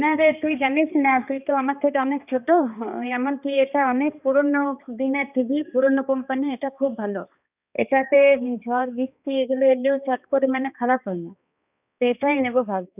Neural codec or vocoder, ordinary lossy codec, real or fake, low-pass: codec, 16 kHz, 2 kbps, X-Codec, HuBERT features, trained on balanced general audio; none; fake; 3.6 kHz